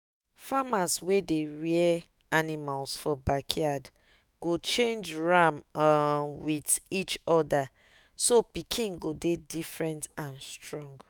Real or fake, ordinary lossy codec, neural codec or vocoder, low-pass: fake; none; autoencoder, 48 kHz, 128 numbers a frame, DAC-VAE, trained on Japanese speech; none